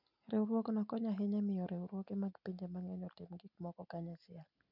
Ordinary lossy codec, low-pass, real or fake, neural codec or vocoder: none; 5.4 kHz; real; none